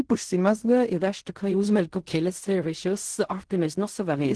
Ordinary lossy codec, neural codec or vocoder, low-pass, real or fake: Opus, 16 kbps; codec, 16 kHz in and 24 kHz out, 0.4 kbps, LongCat-Audio-Codec, fine tuned four codebook decoder; 10.8 kHz; fake